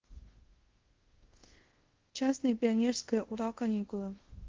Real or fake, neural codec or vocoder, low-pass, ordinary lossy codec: fake; codec, 24 kHz, 0.5 kbps, DualCodec; 7.2 kHz; Opus, 16 kbps